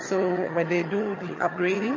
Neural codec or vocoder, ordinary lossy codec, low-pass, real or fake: vocoder, 22.05 kHz, 80 mel bands, HiFi-GAN; MP3, 32 kbps; 7.2 kHz; fake